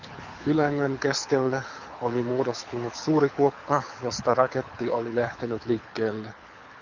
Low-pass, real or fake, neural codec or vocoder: 7.2 kHz; fake; codec, 24 kHz, 6 kbps, HILCodec